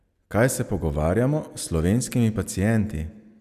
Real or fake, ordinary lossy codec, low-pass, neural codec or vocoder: real; none; 14.4 kHz; none